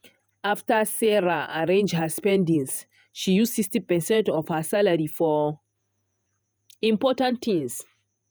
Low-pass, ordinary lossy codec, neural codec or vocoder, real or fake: none; none; vocoder, 48 kHz, 128 mel bands, Vocos; fake